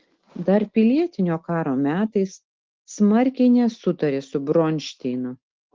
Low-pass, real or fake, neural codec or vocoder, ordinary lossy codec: 7.2 kHz; real; none; Opus, 16 kbps